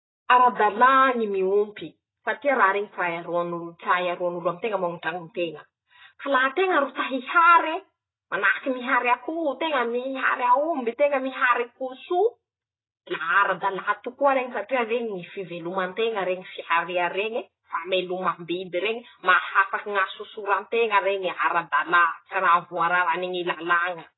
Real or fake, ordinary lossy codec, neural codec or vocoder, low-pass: real; AAC, 16 kbps; none; 7.2 kHz